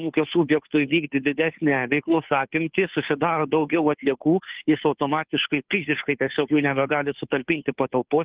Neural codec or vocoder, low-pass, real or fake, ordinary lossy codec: codec, 16 kHz, 2 kbps, FunCodec, trained on Chinese and English, 25 frames a second; 3.6 kHz; fake; Opus, 16 kbps